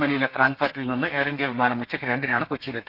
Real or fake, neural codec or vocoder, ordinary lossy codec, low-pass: fake; codec, 32 kHz, 1.9 kbps, SNAC; none; 5.4 kHz